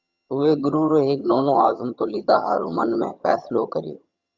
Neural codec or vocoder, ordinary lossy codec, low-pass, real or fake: vocoder, 22.05 kHz, 80 mel bands, HiFi-GAN; Opus, 64 kbps; 7.2 kHz; fake